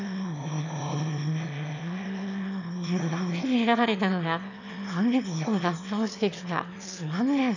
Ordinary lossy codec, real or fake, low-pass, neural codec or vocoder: none; fake; 7.2 kHz; autoencoder, 22.05 kHz, a latent of 192 numbers a frame, VITS, trained on one speaker